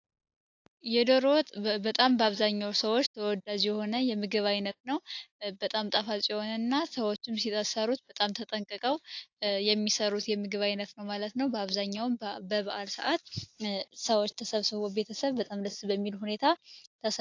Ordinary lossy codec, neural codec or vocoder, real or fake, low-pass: AAC, 48 kbps; none; real; 7.2 kHz